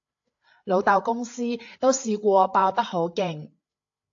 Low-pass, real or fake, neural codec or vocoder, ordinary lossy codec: 7.2 kHz; fake; codec, 16 kHz, 8 kbps, FreqCodec, larger model; AAC, 48 kbps